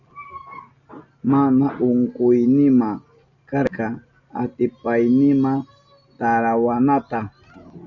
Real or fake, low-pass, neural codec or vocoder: real; 7.2 kHz; none